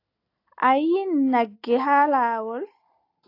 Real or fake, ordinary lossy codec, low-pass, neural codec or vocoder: real; AAC, 32 kbps; 5.4 kHz; none